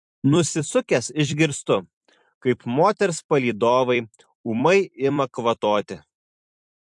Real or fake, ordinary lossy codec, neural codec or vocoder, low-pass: fake; MP3, 64 kbps; vocoder, 44.1 kHz, 128 mel bands every 256 samples, BigVGAN v2; 10.8 kHz